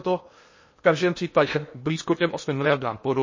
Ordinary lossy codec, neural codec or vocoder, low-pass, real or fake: MP3, 32 kbps; codec, 16 kHz in and 24 kHz out, 0.8 kbps, FocalCodec, streaming, 65536 codes; 7.2 kHz; fake